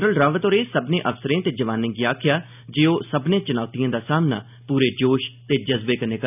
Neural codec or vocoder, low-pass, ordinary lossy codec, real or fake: none; 3.6 kHz; none; real